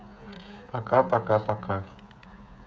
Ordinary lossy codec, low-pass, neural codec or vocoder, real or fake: none; none; codec, 16 kHz, 16 kbps, FreqCodec, smaller model; fake